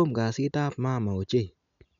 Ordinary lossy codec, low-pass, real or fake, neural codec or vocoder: none; 7.2 kHz; real; none